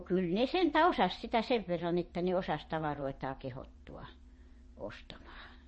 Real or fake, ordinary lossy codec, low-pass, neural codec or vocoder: fake; MP3, 32 kbps; 9.9 kHz; vocoder, 22.05 kHz, 80 mel bands, WaveNeXt